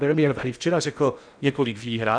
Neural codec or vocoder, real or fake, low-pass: codec, 16 kHz in and 24 kHz out, 0.6 kbps, FocalCodec, streaming, 4096 codes; fake; 9.9 kHz